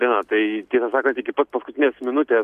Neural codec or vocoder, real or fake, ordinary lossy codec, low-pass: none; real; MP3, 96 kbps; 14.4 kHz